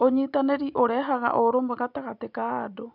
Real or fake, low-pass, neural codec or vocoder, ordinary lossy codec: real; 5.4 kHz; none; none